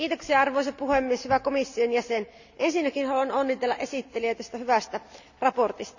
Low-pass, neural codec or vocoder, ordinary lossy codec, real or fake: 7.2 kHz; none; none; real